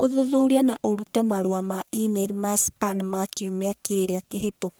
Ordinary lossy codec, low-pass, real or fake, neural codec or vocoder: none; none; fake; codec, 44.1 kHz, 1.7 kbps, Pupu-Codec